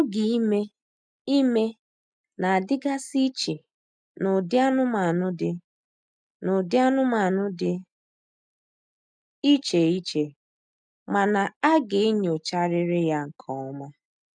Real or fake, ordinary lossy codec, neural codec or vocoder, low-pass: real; none; none; 9.9 kHz